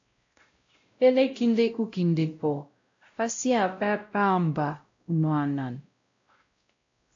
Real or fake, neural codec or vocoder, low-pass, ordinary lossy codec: fake; codec, 16 kHz, 0.5 kbps, X-Codec, WavLM features, trained on Multilingual LibriSpeech; 7.2 kHz; AAC, 48 kbps